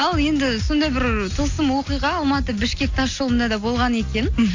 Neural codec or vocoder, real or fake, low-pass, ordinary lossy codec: none; real; 7.2 kHz; AAC, 48 kbps